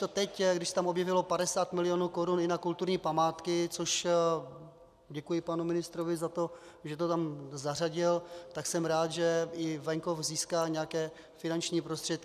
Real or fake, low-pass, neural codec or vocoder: real; 14.4 kHz; none